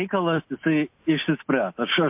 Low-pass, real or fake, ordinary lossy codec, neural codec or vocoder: 3.6 kHz; real; MP3, 32 kbps; none